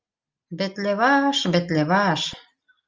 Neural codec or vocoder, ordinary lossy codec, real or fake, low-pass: none; Opus, 24 kbps; real; 7.2 kHz